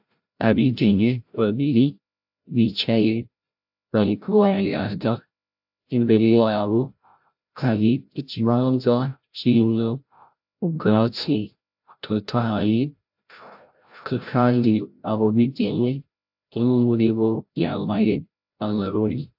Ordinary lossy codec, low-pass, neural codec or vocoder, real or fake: AAC, 48 kbps; 5.4 kHz; codec, 16 kHz, 0.5 kbps, FreqCodec, larger model; fake